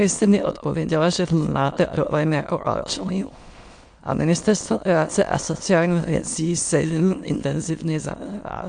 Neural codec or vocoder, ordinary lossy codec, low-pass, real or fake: autoencoder, 22.05 kHz, a latent of 192 numbers a frame, VITS, trained on many speakers; AAC, 64 kbps; 9.9 kHz; fake